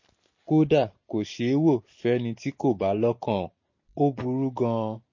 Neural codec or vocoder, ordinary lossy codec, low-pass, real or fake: none; MP3, 32 kbps; 7.2 kHz; real